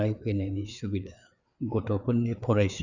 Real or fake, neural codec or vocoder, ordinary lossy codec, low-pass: fake; codec, 16 kHz, 4 kbps, FreqCodec, larger model; none; 7.2 kHz